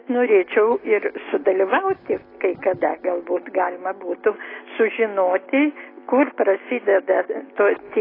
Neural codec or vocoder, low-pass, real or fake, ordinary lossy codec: none; 5.4 kHz; real; AAC, 24 kbps